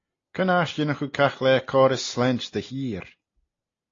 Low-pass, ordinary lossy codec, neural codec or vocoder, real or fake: 7.2 kHz; AAC, 32 kbps; none; real